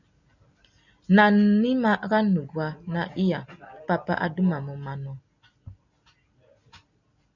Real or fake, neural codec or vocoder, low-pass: real; none; 7.2 kHz